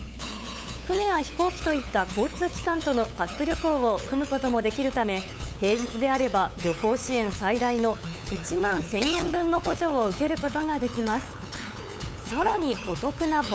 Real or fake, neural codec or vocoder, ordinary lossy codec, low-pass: fake; codec, 16 kHz, 8 kbps, FunCodec, trained on LibriTTS, 25 frames a second; none; none